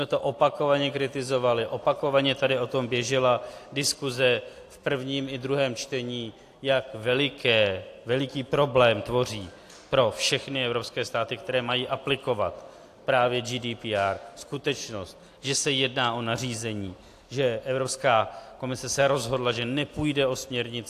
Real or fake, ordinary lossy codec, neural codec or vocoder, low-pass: real; AAC, 64 kbps; none; 14.4 kHz